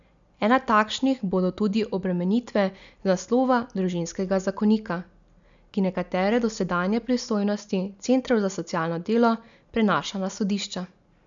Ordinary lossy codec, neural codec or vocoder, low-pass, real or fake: none; none; 7.2 kHz; real